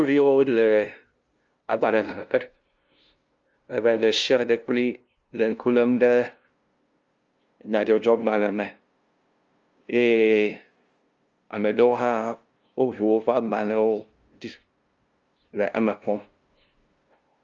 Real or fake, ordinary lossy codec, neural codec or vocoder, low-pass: fake; Opus, 32 kbps; codec, 16 kHz, 0.5 kbps, FunCodec, trained on LibriTTS, 25 frames a second; 7.2 kHz